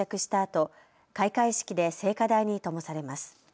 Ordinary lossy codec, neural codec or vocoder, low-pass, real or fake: none; none; none; real